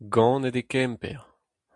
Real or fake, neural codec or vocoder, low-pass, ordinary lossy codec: real; none; 10.8 kHz; AAC, 64 kbps